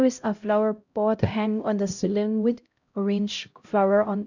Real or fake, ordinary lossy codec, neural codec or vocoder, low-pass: fake; none; codec, 16 kHz, 0.5 kbps, X-Codec, HuBERT features, trained on LibriSpeech; 7.2 kHz